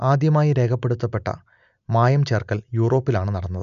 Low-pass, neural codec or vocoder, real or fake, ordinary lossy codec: 7.2 kHz; none; real; none